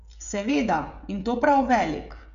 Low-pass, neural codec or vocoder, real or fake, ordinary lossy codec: 7.2 kHz; codec, 16 kHz, 16 kbps, FreqCodec, smaller model; fake; none